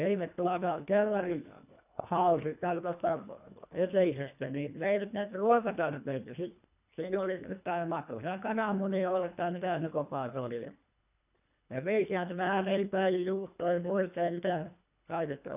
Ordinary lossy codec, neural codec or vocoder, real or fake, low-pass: none; codec, 24 kHz, 1.5 kbps, HILCodec; fake; 3.6 kHz